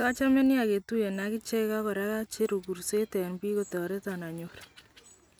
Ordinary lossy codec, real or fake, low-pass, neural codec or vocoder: none; real; none; none